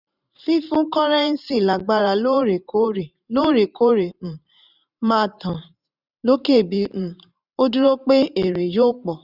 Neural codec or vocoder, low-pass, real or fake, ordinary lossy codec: vocoder, 44.1 kHz, 128 mel bands every 512 samples, BigVGAN v2; 5.4 kHz; fake; none